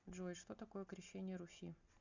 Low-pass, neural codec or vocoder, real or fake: 7.2 kHz; none; real